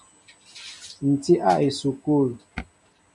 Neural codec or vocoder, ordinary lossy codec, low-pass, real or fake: none; MP3, 96 kbps; 10.8 kHz; real